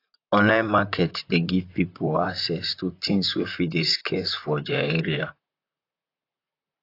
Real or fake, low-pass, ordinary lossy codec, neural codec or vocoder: fake; 5.4 kHz; AAC, 32 kbps; vocoder, 44.1 kHz, 128 mel bands, Pupu-Vocoder